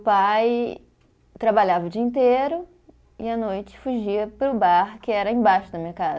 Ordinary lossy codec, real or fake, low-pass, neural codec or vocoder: none; real; none; none